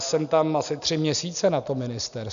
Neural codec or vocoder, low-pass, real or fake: none; 7.2 kHz; real